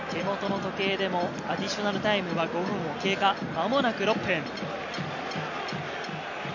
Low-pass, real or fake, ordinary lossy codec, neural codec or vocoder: 7.2 kHz; real; none; none